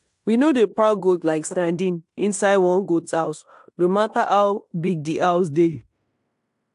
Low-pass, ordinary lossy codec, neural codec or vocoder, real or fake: 10.8 kHz; AAC, 64 kbps; codec, 16 kHz in and 24 kHz out, 0.9 kbps, LongCat-Audio-Codec, fine tuned four codebook decoder; fake